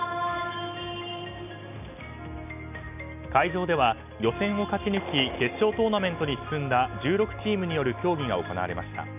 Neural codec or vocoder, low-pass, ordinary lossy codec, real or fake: none; 3.6 kHz; none; real